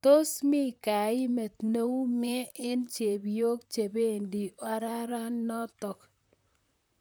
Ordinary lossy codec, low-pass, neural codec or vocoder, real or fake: none; none; vocoder, 44.1 kHz, 128 mel bands, Pupu-Vocoder; fake